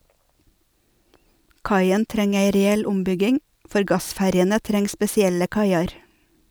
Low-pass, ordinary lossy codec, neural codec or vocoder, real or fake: none; none; none; real